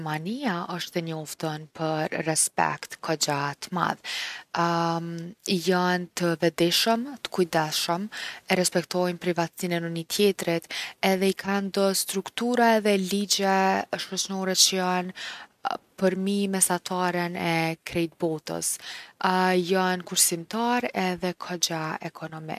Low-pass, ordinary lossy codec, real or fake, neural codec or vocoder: 14.4 kHz; none; real; none